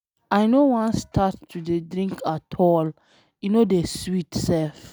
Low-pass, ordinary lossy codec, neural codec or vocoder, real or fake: none; none; none; real